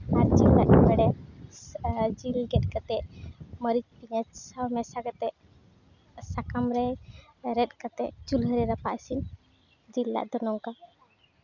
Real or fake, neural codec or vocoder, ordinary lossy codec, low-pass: real; none; none; 7.2 kHz